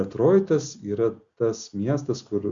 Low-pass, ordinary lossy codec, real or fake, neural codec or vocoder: 7.2 kHz; Opus, 64 kbps; real; none